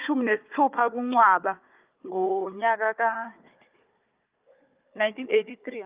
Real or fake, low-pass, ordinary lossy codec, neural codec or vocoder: fake; 3.6 kHz; Opus, 24 kbps; codec, 16 kHz, 4 kbps, FunCodec, trained on Chinese and English, 50 frames a second